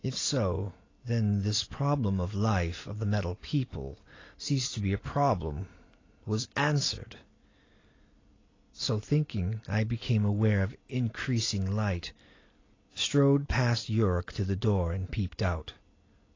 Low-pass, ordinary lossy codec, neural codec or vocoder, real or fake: 7.2 kHz; AAC, 32 kbps; none; real